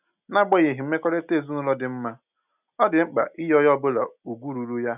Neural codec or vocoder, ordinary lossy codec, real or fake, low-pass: none; none; real; 3.6 kHz